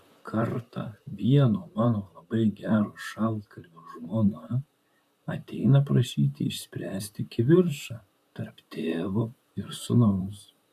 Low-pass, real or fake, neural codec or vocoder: 14.4 kHz; fake; vocoder, 44.1 kHz, 128 mel bands, Pupu-Vocoder